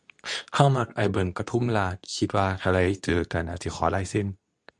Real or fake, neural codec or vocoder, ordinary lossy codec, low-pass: fake; codec, 24 kHz, 0.9 kbps, WavTokenizer, medium speech release version 2; none; 10.8 kHz